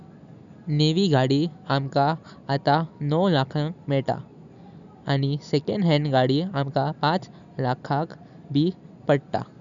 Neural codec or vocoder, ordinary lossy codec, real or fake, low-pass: none; none; real; 7.2 kHz